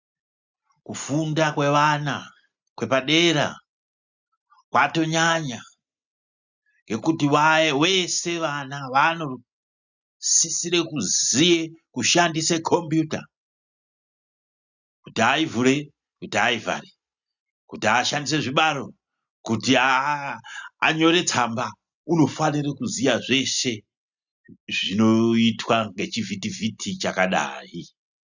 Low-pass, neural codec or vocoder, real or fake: 7.2 kHz; none; real